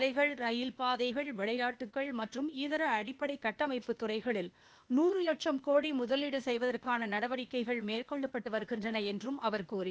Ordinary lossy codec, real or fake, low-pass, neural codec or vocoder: none; fake; none; codec, 16 kHz, 0.8 kbps, ZipCodec